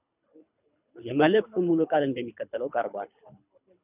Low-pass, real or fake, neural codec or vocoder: 3.6 kHz; fake; codec, 24 kHz, 3 kbps, HILCodec